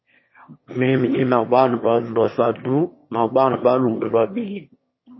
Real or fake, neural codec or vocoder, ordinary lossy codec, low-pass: fake; autoencoder, 22.05 kHz, a latent of 192 numbers a frame, VITS, trained on one speaker; MP3, 24 kbps; 7.2 kHz